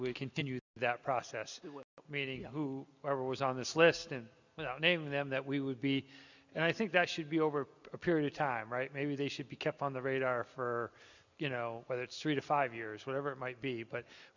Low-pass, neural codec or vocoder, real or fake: 7.2 kHz; none; real